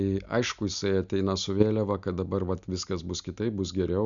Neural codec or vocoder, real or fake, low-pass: none; real; 7.2 kHz